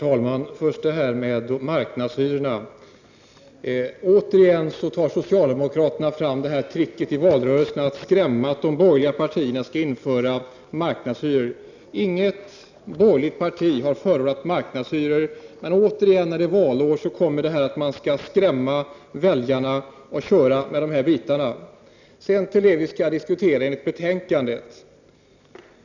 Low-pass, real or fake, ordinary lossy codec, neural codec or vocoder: 7.2 kHz; real; none; none